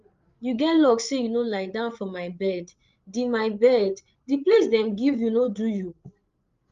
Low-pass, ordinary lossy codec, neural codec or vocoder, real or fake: 7.2 kHz; Opus, 32 kbps; codec, 16 kHz, 8 kbps, FreqCodec, larger model; fake